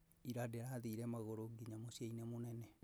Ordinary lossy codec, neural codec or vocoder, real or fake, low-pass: none; none; real; none